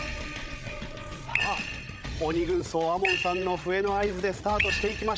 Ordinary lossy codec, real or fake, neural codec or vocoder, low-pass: none; fake; codec, 16 kHz, 16 kbps, FreqCodec, larger model; none